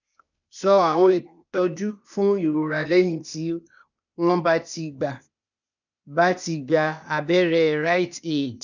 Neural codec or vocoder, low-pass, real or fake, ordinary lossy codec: codec, 16 kHz, 0.8 kbps, ZipCodec; 7.2 kHz; fake; none